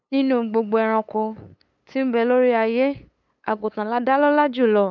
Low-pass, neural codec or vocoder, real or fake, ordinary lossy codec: 7.2 kHz; codec, 16 kHz, 8 kbps, FunCodec, trained on LibriTTS, 25 frames a second; fake; none